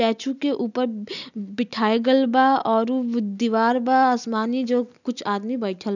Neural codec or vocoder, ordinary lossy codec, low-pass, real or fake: none; none; 7.2 kHz; real